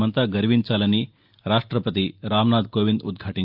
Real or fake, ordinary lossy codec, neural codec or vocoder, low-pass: real; Opus, 24 kbps; none; 5.4 kHz